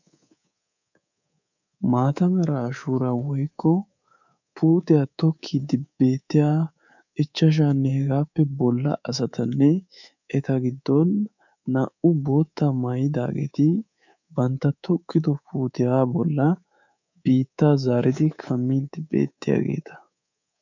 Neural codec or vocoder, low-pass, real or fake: codec, 24 kHz, 3.1 kbps, DualCodec; 7.2 kHz; fake